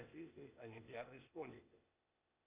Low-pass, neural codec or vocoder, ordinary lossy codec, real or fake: 3.6 kHz; codec, 16 kHz, 0.8 kbps, ZipCodec; Opus, 64 kbps; fake